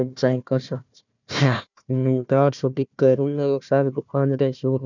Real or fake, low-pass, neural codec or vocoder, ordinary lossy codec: fake; 7.2 kHz; codec, 16 kHz, 1 kbps, FunCodec, trained on Chinese and English, 50 frames a second; none